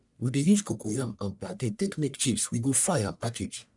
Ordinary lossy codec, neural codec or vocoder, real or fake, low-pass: none; codec, 44.1 kHz, 1.7 kbps, Pupu-Codec; fake; 10.8 kHz